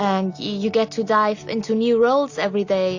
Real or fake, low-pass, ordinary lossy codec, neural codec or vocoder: real; 7.2 kHz; AAC, 48 kbps; none